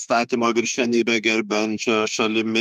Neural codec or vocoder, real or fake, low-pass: autoencoder, 48 kHz, 32 numbers a frame, DAC-VAE, trained on Japanese speech; fake; 14.4 kHz